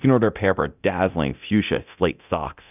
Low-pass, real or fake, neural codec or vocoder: 3.6 kHz; fake; codec, 24 kHz, 0.9 kbps, DualCodec